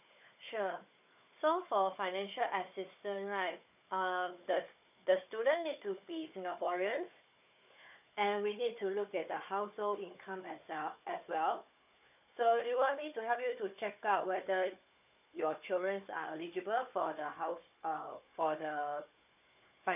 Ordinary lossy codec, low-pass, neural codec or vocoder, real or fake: none; 3.6 kHz; codec, 16 kHz, 4 kbps, FunCodec, trained on Chinese and English, 50 frames a second; fake